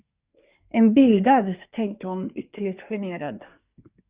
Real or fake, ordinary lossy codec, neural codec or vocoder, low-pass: fake; Opus, 64 kbps; codec, 24 kHz, 1 kbps, SNAC; 3.6 kHz